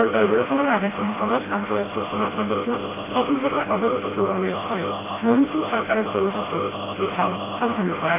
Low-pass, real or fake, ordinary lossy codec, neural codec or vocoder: 3.6 kHz; fake; AAC, 16 kbps; codec, 16 kHz, 0.5 kbps, FreqCodec, smaller model